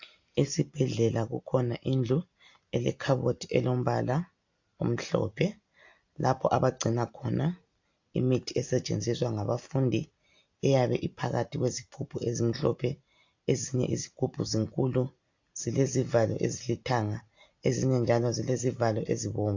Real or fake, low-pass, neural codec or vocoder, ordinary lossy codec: real; 7.2 kHz; none; AAC, 48 kbps